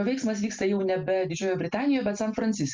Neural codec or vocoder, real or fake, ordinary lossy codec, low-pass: none; real; Opus, 32 kbps; 7.2 kHz